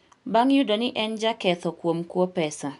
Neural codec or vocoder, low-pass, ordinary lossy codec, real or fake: none; 10.8 kHz; none; real